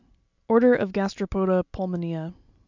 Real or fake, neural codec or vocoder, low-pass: real; none; 7.2 kHz